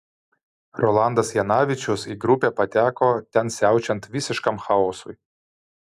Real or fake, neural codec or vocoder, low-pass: real; none; 14.4 kHz